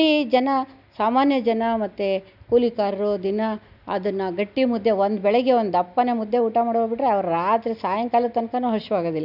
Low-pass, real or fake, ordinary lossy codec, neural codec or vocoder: 5.4 kHz; real; none; none